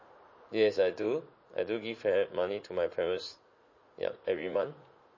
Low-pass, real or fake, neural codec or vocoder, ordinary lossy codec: 7.2 kHz; fake; vocoder, 44.1 kHz, 80 mel bands, Vocos; MP3, 32 kbps